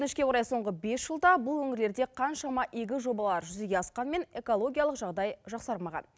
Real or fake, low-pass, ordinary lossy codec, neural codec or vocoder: real; none; none; none